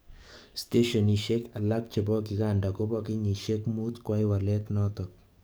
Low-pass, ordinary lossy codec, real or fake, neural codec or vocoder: none; none; fake; codec, 44.1 kHz, 7.8 kbps, DAC